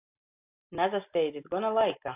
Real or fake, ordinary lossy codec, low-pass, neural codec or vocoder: real; AAC, 24 kbps; 3.6 kHz; none